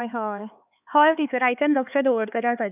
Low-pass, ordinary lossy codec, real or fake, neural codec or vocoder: 3.6 kHz; none; fake; codec, 16 kHz, 4 kbps, X-Codec, HuBERT features, trained on LibriSpeech